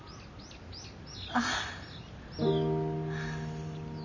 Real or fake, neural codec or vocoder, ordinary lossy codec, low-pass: real; none; none; 7.2 kHz